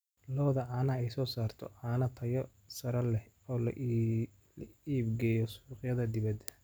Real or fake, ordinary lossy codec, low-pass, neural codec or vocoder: real; none; none; none